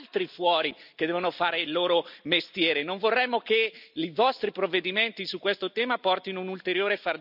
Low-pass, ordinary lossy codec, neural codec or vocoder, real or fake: 5.4 kHz; none; none; real